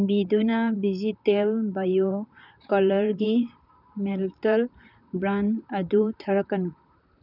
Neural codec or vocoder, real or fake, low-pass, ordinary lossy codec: vocoder, 44.1 kHz, 128 mel bands, Pupu-Vocoder; fake; 5.4 kHz; none